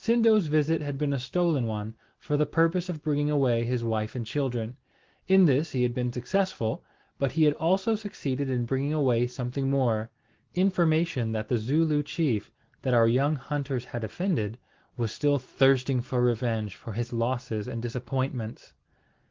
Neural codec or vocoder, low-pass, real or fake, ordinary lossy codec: none; 7.2 kHz; real; Opus, 16 kbps